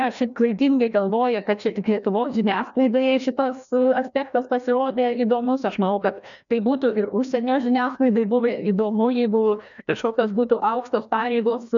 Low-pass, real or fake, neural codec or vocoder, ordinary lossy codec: 7.2 kHz; fake; codec, 16 kHz, 1 kbps, FreqCodec, larger model; MP3, 96 kbps